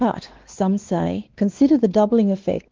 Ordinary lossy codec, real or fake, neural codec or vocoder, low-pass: Opus, 16 kbps; fake; codec, 16 kHz, 2 kbps, X-Codec, WavLM features, trained on Multilingual LibriSpeech; 7.2 kHz